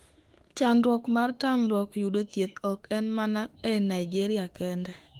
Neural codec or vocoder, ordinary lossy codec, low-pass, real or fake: autoencoder, 48 kHz, 32 numbers a frame, DAC-VAE, trained on Japanese speech; Opus, 24 kbps; 19.8 kHz; fake